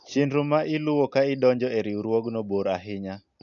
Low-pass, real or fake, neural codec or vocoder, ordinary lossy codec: 7.2 kHz; real; none; none